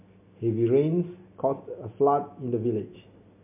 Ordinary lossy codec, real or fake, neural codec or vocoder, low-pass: MP3, 32 kbps; real; none; 3.6 kHz